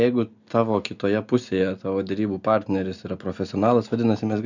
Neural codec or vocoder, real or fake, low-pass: none; real; 7.2 kHz